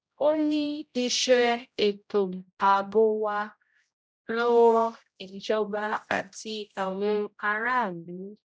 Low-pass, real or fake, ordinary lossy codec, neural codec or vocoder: none; fake; none; codec, 16 kHz, 0.5 kbps, X-Codec, HuBERT features, trained on general audio